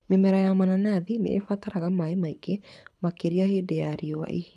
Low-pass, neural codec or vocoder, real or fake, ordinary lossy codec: none; codec, 24 kHz, 6 kbps, HILCodec; fake; none